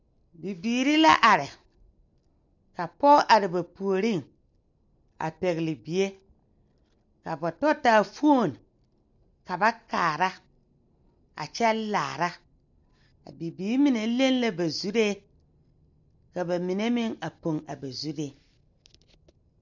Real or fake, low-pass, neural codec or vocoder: real; 7.2 kHz; none